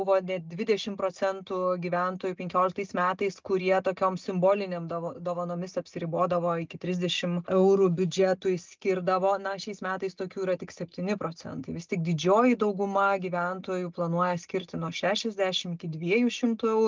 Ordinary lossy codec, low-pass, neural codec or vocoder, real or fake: Opus, 24 kbps; 7.2 kHz; none; real